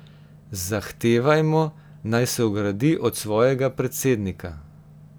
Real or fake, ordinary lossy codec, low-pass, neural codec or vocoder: real; none; none; none